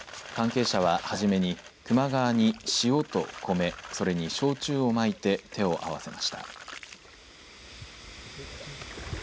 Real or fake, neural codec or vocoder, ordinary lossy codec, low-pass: real; none; none; none